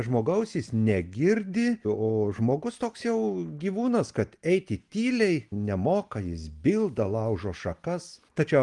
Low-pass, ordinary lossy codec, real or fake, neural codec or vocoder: 10.8 kHz; Opus, 24 kbps; real; none